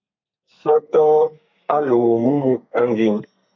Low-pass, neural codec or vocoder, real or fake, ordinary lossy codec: 7.2 kHz; codec, 32 kHz, 1.9 kbps, SNAC; fake; MP3, 48 kbps